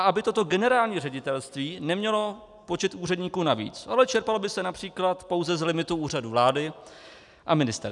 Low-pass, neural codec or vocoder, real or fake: 10.8 kHz; none; real